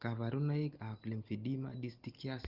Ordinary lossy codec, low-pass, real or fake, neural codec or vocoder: Opus, 16 kbps; 5.4 kHz; real; none